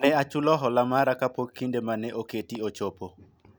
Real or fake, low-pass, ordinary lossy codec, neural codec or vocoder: real; none; none; none